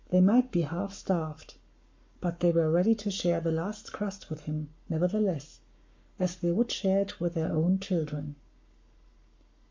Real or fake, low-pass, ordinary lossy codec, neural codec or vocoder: fake; 7.2 kHz; MP3, 48 kbps; codec, 44.1 kHz, 7.8 kbps, Pupu-Codec